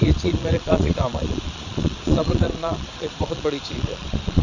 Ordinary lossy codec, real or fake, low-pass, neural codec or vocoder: none; fake; 7.2 kHz; vocoder, 22.05 kHz, 80 mel bands, Vocos